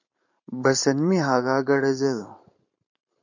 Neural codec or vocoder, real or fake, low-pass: vocoder, 44.1 kHz, 128 mel bands every 512 samples, BigVGAN v2; fake; 7.2 kHz